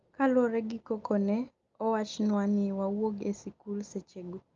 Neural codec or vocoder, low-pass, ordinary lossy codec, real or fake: none; 7.2 kHz; Opus, 32 kbps; real